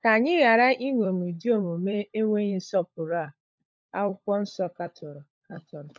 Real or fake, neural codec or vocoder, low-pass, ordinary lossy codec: fake; codec, 16 kHz, 16 kbps, FunCodec, trained on LibriTTS, 50 frames a second; none; none